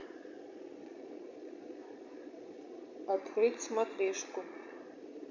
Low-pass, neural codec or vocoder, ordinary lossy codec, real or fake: 7.2 kHz; vocoder, 22.05 kHz, 80 mel bands, Vocos; MP3, 48 kbps; fake